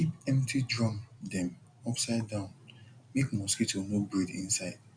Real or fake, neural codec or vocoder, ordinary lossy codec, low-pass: real; none; none; 9.9 kHz